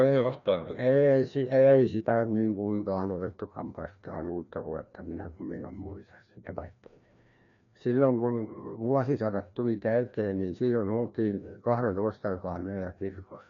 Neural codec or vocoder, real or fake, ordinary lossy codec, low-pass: codec, 16 kHz, 1 kbps, FreqCodec, larger model; fake; none; 7.2 kHz